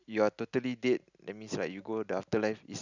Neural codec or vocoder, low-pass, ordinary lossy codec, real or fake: none; 7.2 kHz; none; real